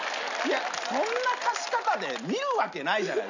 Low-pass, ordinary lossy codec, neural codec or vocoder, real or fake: 7.2 kHz; none; none; real